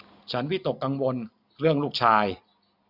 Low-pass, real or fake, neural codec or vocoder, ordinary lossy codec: 5.4 kHz; real; none; none